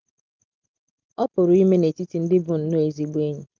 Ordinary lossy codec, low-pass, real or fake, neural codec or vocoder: Opus, 24 kbps; 7.2 kHz; real; none